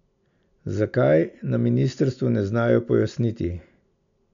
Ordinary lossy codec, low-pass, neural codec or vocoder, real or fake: none; 7.2 kHz; none; real